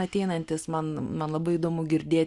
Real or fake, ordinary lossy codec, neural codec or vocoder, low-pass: real; Opus, 64 kbps; none; 10.8 kHz